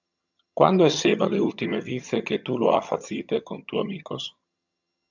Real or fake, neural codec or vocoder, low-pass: fake; vocoder, 22.05 kHz, 80 mel bands, HiFi-GAN; 7.2 kHz